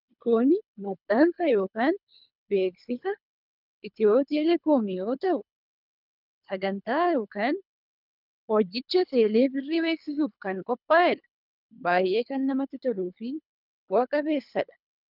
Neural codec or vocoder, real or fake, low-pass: codec, 24 kHz, 3 kbps, HILCodec; fake; 5.4 kHz